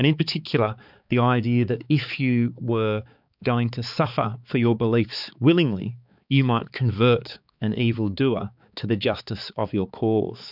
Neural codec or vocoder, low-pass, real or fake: codec, 16 kHz, 4 kbps, X-Codec, HuBERT features, trained on balanced general audio; 5.4 kHz; fake